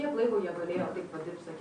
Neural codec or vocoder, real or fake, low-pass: none; real; 9.9 kHz